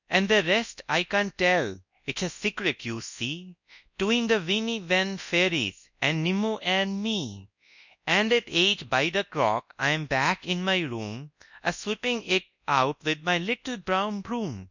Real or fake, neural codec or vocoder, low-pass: fake; codec, 24 kHz, 0.9 kbps, WavTokenizer, large speech release; 7.2 kHz